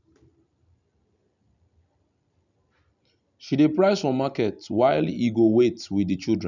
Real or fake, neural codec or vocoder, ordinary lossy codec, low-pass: real; none; none; 7.2 kHz